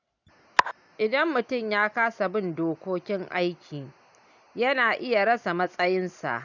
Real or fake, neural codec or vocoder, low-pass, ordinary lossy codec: real; none; 7.2 kHz; none